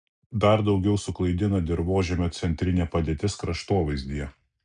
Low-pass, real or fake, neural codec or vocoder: 9.9 kHz; real; none